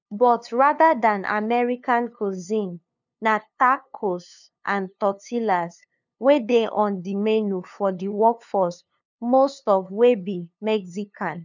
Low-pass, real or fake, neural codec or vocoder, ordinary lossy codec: 7.2 kHz; fake; codec, 16 kHz, 2 kbps, FunCodec, trained on LibriTTS, 25 frames a second; none